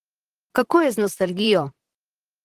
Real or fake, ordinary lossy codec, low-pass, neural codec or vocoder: fake; Opus, 16 kbps; 14.4 kHz; vocoder, 44.1 kHz, 128 mel bands, Pupu-Vocoder